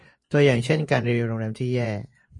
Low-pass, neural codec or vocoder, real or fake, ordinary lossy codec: 10.8 kHz; vocoder, 24 kHz, 100 mel bands, Vocos; fake; MP3, 48 kbps